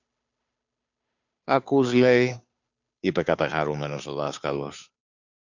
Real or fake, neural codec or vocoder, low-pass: fake; codec, 16 kHz, 2 kbps, FunCodec, trained on Chinese and English, 25 frames a second; 7.2 kHz